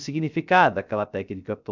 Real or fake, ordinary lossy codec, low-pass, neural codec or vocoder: fake; none; 7.2 kHz; codec, 16 kHz, 0.3 kbps, FocalCodec